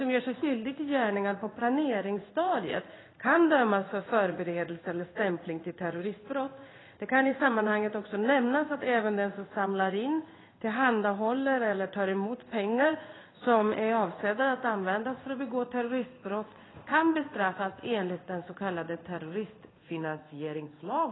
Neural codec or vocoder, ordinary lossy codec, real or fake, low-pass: codec, 16 kHz in and 24 kHz out, 1 kbps, XY-Tokenizer; AAC, 16 kbps; fake; 7.2 kHz